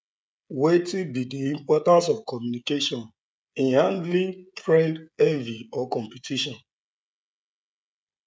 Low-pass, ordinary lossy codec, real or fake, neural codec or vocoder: none; none; fake; codec, 16 kHz, 16 kbps, FreqCodec, smaller model